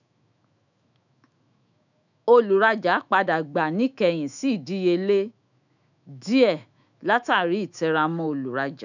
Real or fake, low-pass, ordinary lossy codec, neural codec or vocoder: fake; 7.2 kHz; none; autoencoder, 48 kHz, 128 numbers a frame, DAC-VAE, trained on Japanese speech